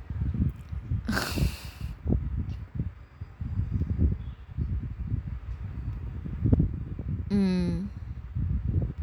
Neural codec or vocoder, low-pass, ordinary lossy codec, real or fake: none; none; none; real